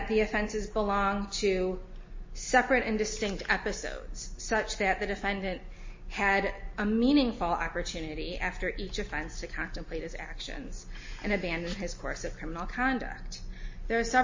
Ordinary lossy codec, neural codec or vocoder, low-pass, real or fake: MP3, 32 kbps; none; 7.2 kHz; real